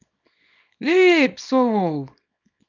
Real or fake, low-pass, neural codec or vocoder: fake; 7.2 kHz; codec, 24 kHz, 0.9 kbps, WavTokenizer, small release